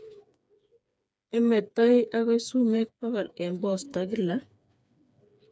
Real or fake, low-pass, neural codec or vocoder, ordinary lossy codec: fake; none; codec, 16 kHz, 4 kbps, FreqCodec, smaller model; none